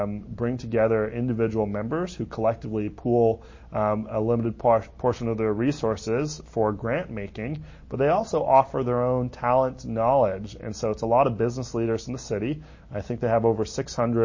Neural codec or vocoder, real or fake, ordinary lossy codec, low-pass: none; real; MP3, 32 kbps; 7.2 kHz